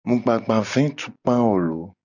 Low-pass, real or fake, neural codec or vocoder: 7.2 kHz; real; none